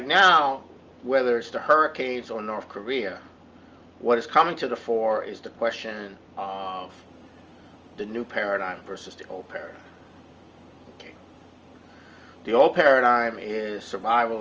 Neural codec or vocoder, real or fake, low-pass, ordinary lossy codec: none; real; 7.2 kHz; Opus, 24 kbps